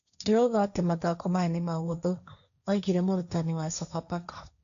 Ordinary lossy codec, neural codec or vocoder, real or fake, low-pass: AAC, 96 kbps; codec, 16 kHz, 1.1 kbps, Voila-Tokenizer; fake; 7.2 kHz